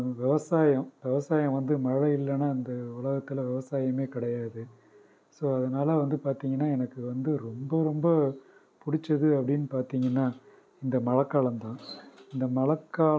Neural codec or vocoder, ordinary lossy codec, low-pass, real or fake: none; none; none; real